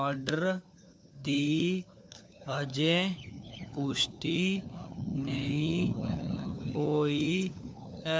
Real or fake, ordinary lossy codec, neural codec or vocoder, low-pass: fake; none; codec, 16 kHz, 4 kbps, FunCodec, trained on LibriTTS, 50 frames a second; none